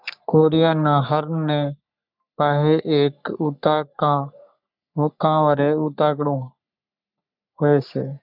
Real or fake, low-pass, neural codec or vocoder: fake; 5.4 kHz; codec, 44.1 kHz, 3.4 kbps, Pupu-Codec